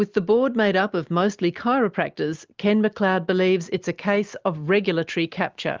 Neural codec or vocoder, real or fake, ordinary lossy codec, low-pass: none; real; Opus, 32 kbps; 7.2 kHz